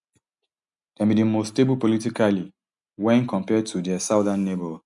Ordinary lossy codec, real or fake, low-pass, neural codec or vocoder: none; real; 10.8 kHz; none